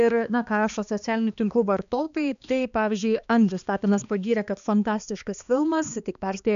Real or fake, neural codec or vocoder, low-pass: fake; codec, 16 kHz, 2 kbps, X-Codec, HuBERT features, trained on balanced general audio; 7.2 kHz